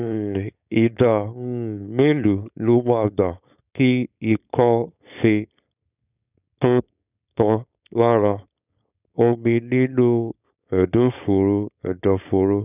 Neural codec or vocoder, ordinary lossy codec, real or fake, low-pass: codec, 16 kHz, 4.8 kbps, FACodec; none; fake; 3.6 kHz